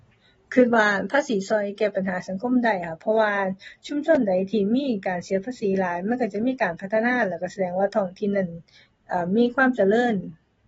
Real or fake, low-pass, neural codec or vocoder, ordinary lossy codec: real; 10.8 kHz; none; AAC, 24 kbps